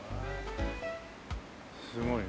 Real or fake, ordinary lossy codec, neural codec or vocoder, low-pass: real; none; none; none